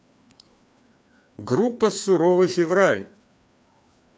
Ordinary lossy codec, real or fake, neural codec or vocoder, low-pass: none; fake; codec, 16 kHz, 2 kbps, FreqCodec, larger model; none